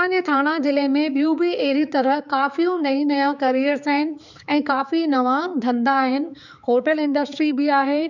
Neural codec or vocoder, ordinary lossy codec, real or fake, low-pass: codec, 16 kHz, 4 kbps, X-Codec, HuBERT features, trained on balanced general audio; none; fake; 7.2 kHz